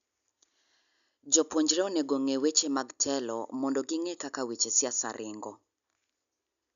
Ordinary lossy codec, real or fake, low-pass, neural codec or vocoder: none; real; 7.2 kHz; none